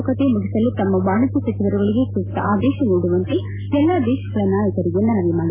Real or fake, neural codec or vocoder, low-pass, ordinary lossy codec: real; none; 3.6 kHz; MP3, 16 kbps